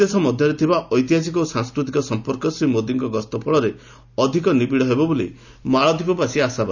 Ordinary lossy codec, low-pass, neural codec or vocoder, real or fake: none; 7.2 kHz; none; real